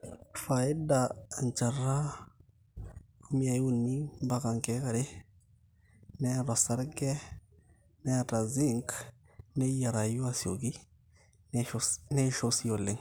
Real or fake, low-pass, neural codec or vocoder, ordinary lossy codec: real; none; none; none